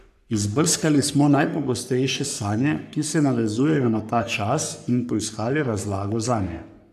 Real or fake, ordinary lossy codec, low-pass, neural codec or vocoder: fake; none; 14.4 kHz; codec, 44.1 kHz, 3.4 kbps, Pupu-Codec